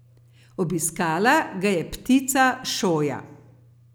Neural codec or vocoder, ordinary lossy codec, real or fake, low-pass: none; none; real; none